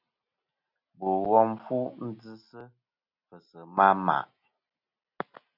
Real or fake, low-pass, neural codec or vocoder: real; 5.4 kHz; none